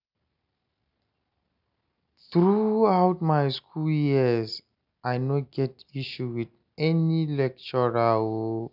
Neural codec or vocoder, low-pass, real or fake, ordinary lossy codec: none; 5.4 kHz; real; none